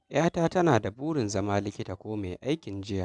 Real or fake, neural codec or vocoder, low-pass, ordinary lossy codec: real; none; 10.8 kHz; none